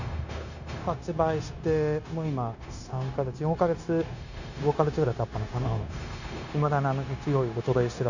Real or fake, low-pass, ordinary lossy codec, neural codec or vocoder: fake; 7.2 kHz; none; codec, 16 kHz, 0.9 kbps, LongCat-Audio-Codec